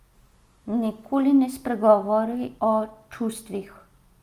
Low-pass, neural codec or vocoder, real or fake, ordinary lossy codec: 14.4 kHz; none; real; Opus, 32 kbps